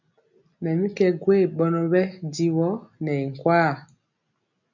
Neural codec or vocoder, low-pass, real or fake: none; 7.2 kHz; real